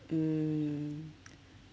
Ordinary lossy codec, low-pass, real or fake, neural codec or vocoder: none; none; real; none